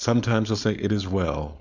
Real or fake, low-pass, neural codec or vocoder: fake; 7.2 kHz; codec, 16 kHz, 4.8 kbps, FACodec